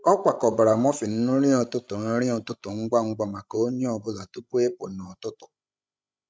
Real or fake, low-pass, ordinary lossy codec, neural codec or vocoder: fake; none; none; codec, 16 kHz, 16 kbps, FreqCodec, larger model